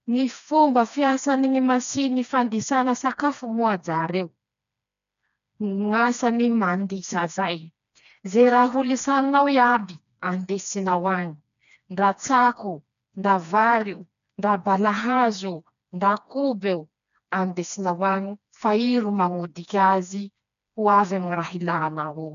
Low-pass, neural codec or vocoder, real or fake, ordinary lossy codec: 7.2 kHz; codec, 16 kHz, 2 kbps, FreqCodec, smaller model; fake; none